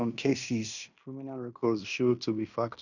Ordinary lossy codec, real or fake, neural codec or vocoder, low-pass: none; fake; codec, 16 kHz in and 24 kHz out, 0.9 kbps, LongCat-Audio-Codec, fine tuned four codebook decoder; 7.2 kHz